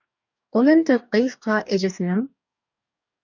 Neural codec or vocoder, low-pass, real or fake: codec, 44.1 kHz, 2.6 kbps, DAC; 7.2 kHz; fake